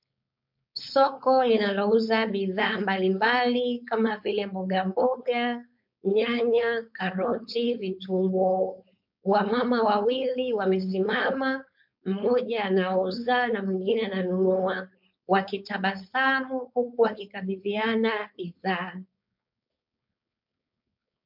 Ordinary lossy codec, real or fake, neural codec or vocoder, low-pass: MP3, 48 kbps; fake; codec, 16 kHz, 4.8 kbps, FACodec; 5.4 kHz